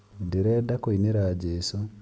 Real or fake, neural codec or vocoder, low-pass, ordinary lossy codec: real; none; none; none